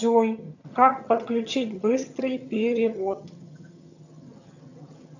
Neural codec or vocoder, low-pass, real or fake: vocoder, 22.05 kHz, 80 mel bands, HiFi-GAN; 7.2 kHz; fake